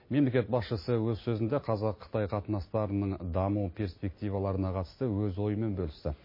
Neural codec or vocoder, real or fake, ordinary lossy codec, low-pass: none; real; MP3, 24 kbps; 5.4 kHz